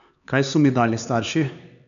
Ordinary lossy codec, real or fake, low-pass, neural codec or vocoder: none; fake; 7.2 kHz; codec, 16 kHz, 4 kbps, X-Codec, HuBERT features, trained on LibriSpeech